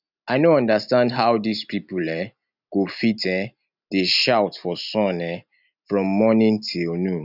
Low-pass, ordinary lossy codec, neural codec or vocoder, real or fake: 5.4 kHz; none; none; real